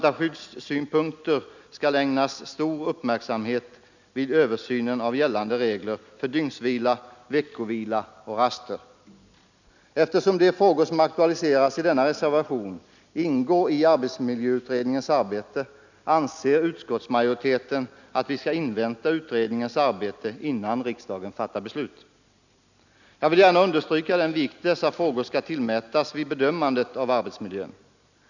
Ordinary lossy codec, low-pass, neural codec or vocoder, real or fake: none; 7.2 kHz; none; real